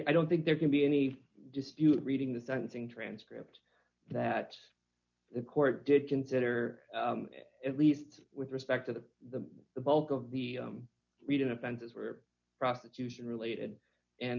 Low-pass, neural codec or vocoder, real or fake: 7.2 kHz; none; real